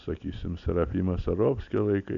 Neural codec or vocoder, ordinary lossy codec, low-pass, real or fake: none; AAC, 64 kbps; 7.2 kHz; real